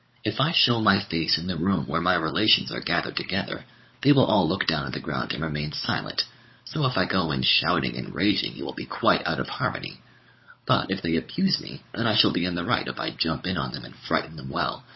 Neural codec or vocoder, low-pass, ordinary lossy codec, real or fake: codec, 16 kHz, 16 kbps, FunCodec, trained on LibriTTS, 50 frames a second; 7.2 kHz; MP3, 24 kbps; fake